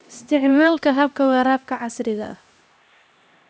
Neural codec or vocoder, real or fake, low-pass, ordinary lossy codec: codec, 16 kHz, 1 kbps, X-Codec, HuBERT features, trained on LibriSpeech; fake; none; none